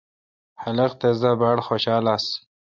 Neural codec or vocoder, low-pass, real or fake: none; 7.2 kHz; real